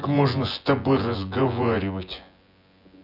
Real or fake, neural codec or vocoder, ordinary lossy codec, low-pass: fake; vocoder, 24 kHz, 100 mel bands, Vocos; none; 5.4 kHz